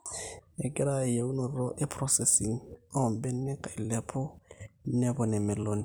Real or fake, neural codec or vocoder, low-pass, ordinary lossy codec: fake; vocoder, 44.1 kHz, 128 mel bands every 256 samples, BigVGAN v2; none; none